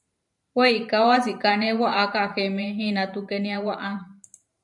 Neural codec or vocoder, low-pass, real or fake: vocoder, 44.1 kHz, 128 mel bands every 512 samples, BigVGAN v2; 10.8 kHz; fake